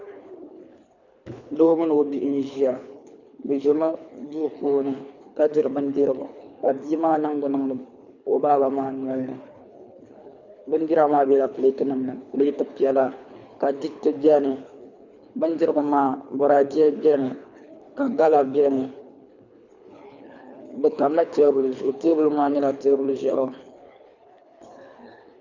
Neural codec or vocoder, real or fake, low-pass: codec, 24 kHz, 3 kbps, HILCodec; fake; 7.2 kHz